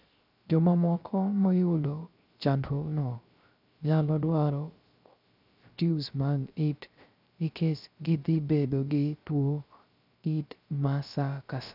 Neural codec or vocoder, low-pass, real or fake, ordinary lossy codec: codec, 16 kHz, 0.3 kbps, FocalCodec; 5.4 kHz; fake; none